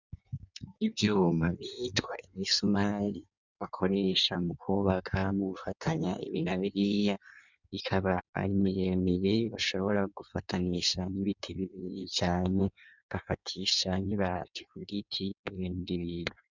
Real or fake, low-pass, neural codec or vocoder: fake; 7.2 kHz; codec, 16 kHz in and 24 kHz out, 1.1 kbps, FireRedTTS-2 codec